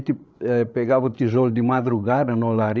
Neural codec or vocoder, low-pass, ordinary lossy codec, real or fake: codec, 16 kHz, 16 kbps, FreqCodec, larger model; none; none; fake